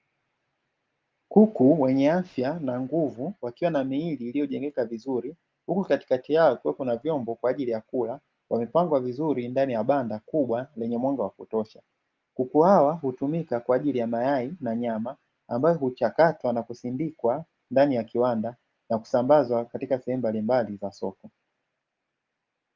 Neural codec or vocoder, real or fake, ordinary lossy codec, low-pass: none; real; Opus, 32 kbps; 7.2 kHz